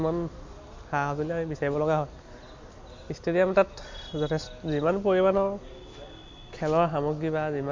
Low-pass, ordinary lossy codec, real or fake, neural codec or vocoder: 7.2 kHz; MP3, 48 kbps; real; none